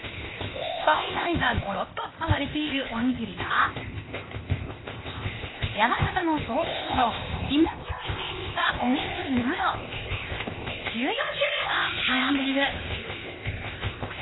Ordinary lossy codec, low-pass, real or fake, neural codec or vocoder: AAC, 16 kbps; 7.2 kHz; fake; codec, 16 kHz, 0.8 kbps, ZipCodec